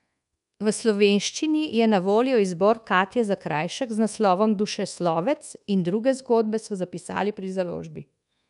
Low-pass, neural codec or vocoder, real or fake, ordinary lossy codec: 10.8 kHz; codec, 24 kHz, 1.2 kbps, DualCodec; fake; none